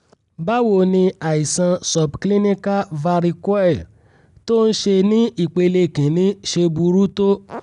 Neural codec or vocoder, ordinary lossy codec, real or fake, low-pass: none; none; real; 10.8 kHz